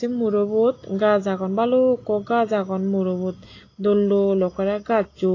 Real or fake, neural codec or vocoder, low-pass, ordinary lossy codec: real; none; 7.2 kHz; AAC, 32 kbps